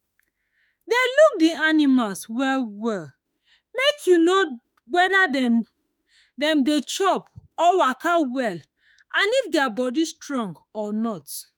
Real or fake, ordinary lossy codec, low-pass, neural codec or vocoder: fake; none; none; autoencoder, 48 kHz, 32 numbers a frame, DAC-VAE, trained on Japanese speech